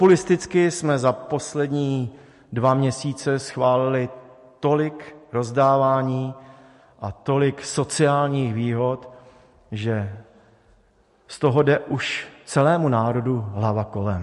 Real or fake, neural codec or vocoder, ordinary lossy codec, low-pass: real; none; MP3, 48 kbps; 14.4 kHz